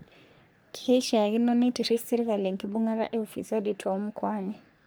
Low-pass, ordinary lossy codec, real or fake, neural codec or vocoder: none; none; fake; codec, 44.1 kHz, 3.4 kbps, Pupu-Codec